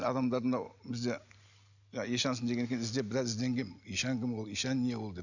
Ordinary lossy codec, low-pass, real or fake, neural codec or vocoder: none; 7.2 kHz; real; none